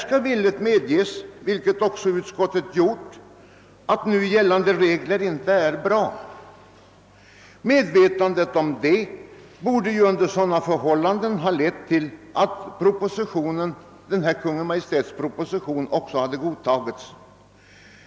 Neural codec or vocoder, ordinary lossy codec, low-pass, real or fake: none; none; none; real